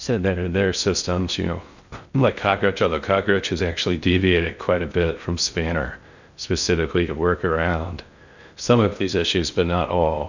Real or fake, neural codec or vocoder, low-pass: fake; codec, 16 kHz in and 24 kHz out, 0.6 kbps, FocalCodec, streaming, 4096 codes; 7.2 kHz